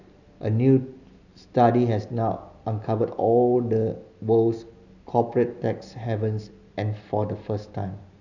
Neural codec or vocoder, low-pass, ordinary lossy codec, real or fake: none; 7.2 kHz; none; real